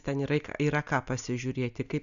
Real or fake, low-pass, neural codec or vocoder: real; 7.2 kHz; none